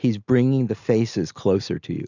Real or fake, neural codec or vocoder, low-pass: real; none; 7.2 kHz